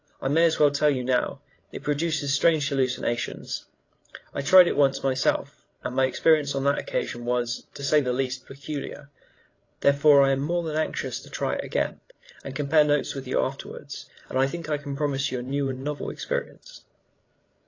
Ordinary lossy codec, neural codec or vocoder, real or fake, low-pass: AAC, 32 kbps; codec, 16 kHz, 16 kbps, FreqCodec, larger model; fake; 7.2 kHz